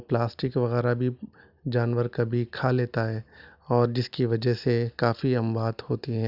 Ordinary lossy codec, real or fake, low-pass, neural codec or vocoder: none; real; 5.4 kHz; none